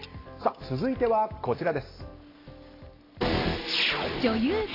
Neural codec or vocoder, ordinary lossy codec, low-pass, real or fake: none; AAC, 24 kbps; 5.4 kHz; real